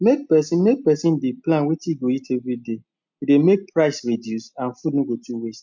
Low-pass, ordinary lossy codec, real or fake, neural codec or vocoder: 7.2 kHz; MP3, 64 kbps; real; none